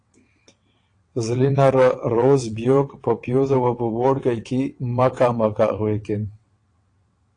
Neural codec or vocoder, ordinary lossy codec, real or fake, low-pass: vocoder, 22.05 kHz, 80 mel bands, WaveNeXt; AAC, 48 kbps; fake; 9.9 kHz